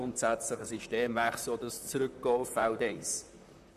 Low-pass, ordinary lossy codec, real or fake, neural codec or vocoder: 14.4 kHz; none; fake; vocoder, 44.1 kHz, 128 mel bands, Pupu-Vocoder